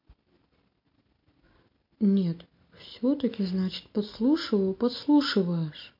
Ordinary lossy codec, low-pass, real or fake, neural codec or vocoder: MP3, 32 kbps; 5.4 kHz; real; none